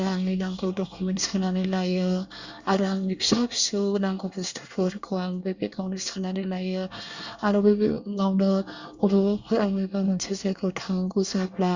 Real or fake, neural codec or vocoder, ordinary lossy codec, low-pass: fake; codec, 24 kHz, 1 kbps, SNAC; Opus, 64 kbps; 7.2 kHz